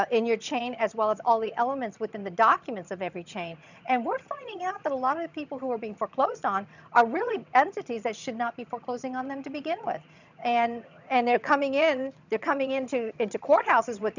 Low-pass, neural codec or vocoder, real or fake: 7.2 kHz; vocoder, 22.05 kHz, 80 mel bands, HiFi-GAN; fake